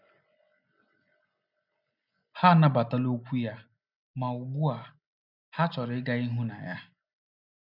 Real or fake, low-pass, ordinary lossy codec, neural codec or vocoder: real; 5.4 kHz; none; none